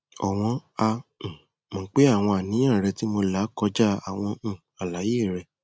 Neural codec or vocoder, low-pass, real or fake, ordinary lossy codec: none; none; real; none